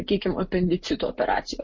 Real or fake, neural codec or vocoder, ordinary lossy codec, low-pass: real; none; MP3, 32 kbps; 7.2 kHz